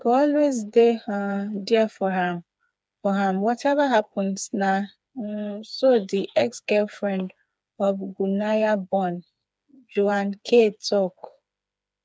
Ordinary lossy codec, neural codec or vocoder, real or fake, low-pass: none; codec, 16 kHz, 4 kbps, FreqCodec, smaller model; fake; none